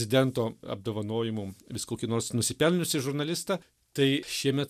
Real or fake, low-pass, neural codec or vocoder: real; 14.4 kHz; none